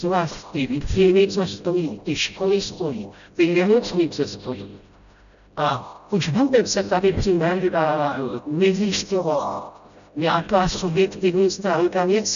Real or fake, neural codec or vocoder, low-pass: fake; codec, 16 kHz, 0.5 kbps, FreqCodec, smaller model; 7.2 kHz